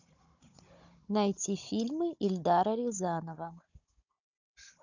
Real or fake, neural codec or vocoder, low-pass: fake; codec, 16 kHz, 16 kbps, FunCodec, trained on Chinese and English, 50 frames a second; 7.2 kHz